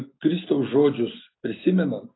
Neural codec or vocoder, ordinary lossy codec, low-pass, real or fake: none; AAC, 16 kbps; 7.2 kHz; real